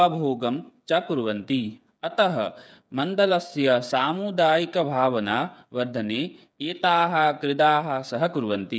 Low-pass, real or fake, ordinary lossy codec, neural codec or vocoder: none; fake; none; codec, 16 kHz, 8 kbps, FreqCodec, smaller model